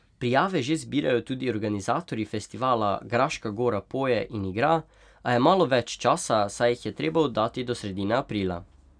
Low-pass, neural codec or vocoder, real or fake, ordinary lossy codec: 9.9 kHz; none; real; none